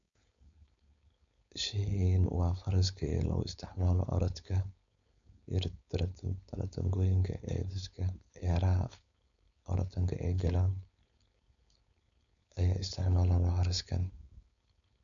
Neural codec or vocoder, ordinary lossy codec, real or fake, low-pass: codec, 16 kHz, 4.8 kbps, FACodec; none; fake; 7.2 kHz